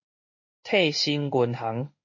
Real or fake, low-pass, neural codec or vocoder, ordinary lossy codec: fake; 7.2 kHz; codec, 16 kHz in and 24 kHz out, 1 kbps, XY-Tokenizer; MP3, 32 kbps